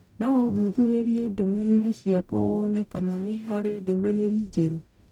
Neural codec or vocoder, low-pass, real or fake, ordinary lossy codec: codec, 44.1 kHz, 0.9 kbps, DAC; 19.8 kHz; fake; none